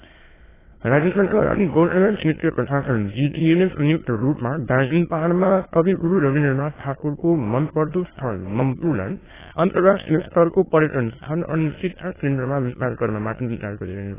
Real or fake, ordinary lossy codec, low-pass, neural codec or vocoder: fake; AAC, 16 kbps; 3.6 kHz; autoencoder, 22.05 kHz, a latent of 192 numbers a frame, VITS, trained on many speakers